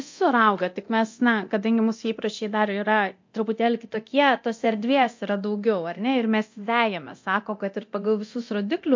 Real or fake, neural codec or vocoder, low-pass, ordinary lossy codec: fake; codec, 24 kHz, 0.9 kbps, DualCodec; 7.2 kHz; MP3, 48 kbps